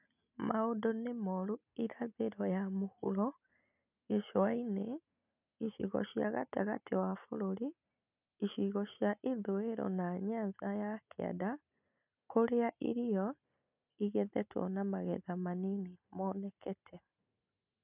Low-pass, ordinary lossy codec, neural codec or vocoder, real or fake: 3.6 kHz; none; none; real